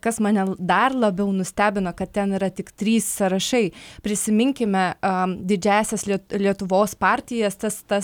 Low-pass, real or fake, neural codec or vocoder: 19.8 kHz; real; none